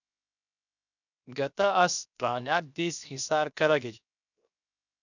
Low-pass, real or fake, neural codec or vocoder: 7.2 kHz; fake; codec, 16 kHz, 0.7 kbps, FocalCodec